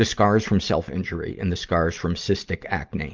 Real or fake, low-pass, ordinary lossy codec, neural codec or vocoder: real; 7.2 kHz; Opus, 24 kbps; none